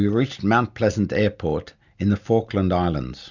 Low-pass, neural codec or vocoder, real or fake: 7.2 kHz; none; real